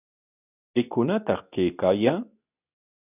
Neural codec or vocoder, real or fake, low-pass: codec, 24 kHz, 0.9 kbps, WavTokenizer, medium speech release version 2; fake; 3.6 kHz